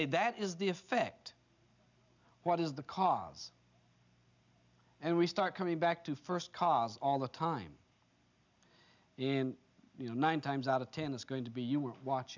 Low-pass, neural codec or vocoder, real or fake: 7.2 kHz; none; real